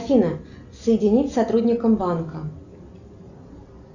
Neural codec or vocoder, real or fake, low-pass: none; real; 7.2 kHz